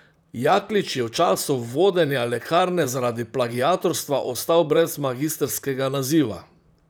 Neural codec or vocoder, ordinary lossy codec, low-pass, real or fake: vocoder, 44.1 kHz, 128 mel bands, Pupu-Vocoder; none; none; fake